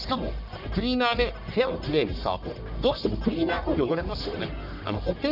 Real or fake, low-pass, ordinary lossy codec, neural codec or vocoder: fake; 5.4 kHz; none; codec, 44.1 kHz, 1.7 kbps, Pupu-Codec